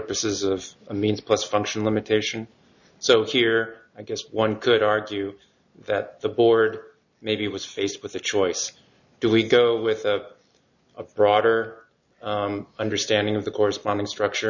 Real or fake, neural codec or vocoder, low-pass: real; none; 7.2 kHz